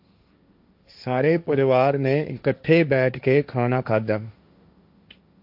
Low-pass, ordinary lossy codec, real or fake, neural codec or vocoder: 5.4 kHz; AAC, 48 kbps; fake; codec, 16 kHz, 1.1 kbps, Voila-Tokenizer